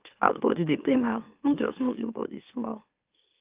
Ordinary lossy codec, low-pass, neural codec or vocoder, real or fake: Opus, 24 kbps; 3.6 kHz; autoencoder, 44.1 kHz, a latent of 192 numbers a frame, MeloTTS; fake